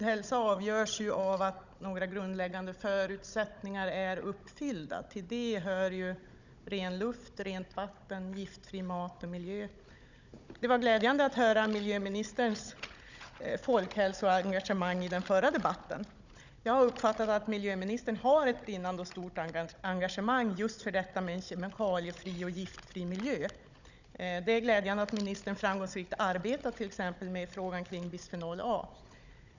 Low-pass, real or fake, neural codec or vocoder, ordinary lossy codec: 7.2 kHz; fake; codec, 16 kHz, 16 kbps, FunCodec, trained on Chinese and English, 50 frames a second; none